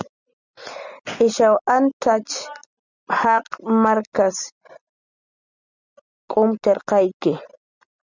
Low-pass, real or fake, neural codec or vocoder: 7.2 kHz; real; none